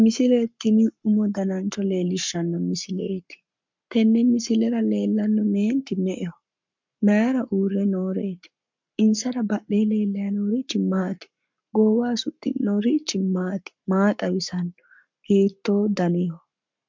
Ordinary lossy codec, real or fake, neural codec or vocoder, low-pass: MP3, 64 kbps; fake; codec, 44.1 kHz, 7.8 kbps, Pupu-Codec; 7.2 kHz